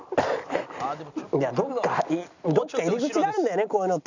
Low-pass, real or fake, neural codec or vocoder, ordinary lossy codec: 7.2 kHz; real; none; none